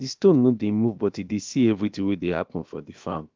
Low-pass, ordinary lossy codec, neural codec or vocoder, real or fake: 7.2 kHz; Opus, 24 kbps; codec, 16 kHz, about 1 kbps, DyCAST, with the encoder's durations; fake